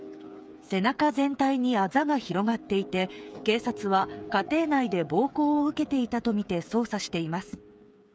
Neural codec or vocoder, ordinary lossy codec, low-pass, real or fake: codec, 16 kHz, 8 kbps, FreqCodec, smaller model; none; none; fake